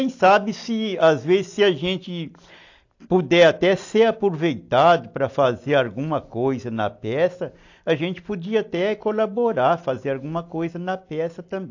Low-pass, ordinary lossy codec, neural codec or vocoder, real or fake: 7.2 kHz; AAC, 48 kbps; none; real